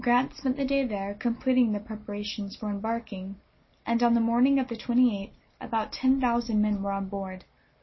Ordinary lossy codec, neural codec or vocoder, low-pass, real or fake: MP3, 24 kbps; none; 7.2 kHz; real